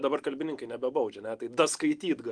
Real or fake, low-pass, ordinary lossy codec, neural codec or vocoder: real; 9.9 kHz; Opus, 24 kbps; none